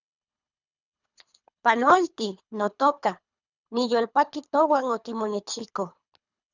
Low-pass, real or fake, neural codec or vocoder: 7.2 kHz; fake; codec, 24 kHz, 3 kbps, HILCodec